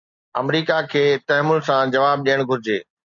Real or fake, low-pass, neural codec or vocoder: real; 7.2 kHz; none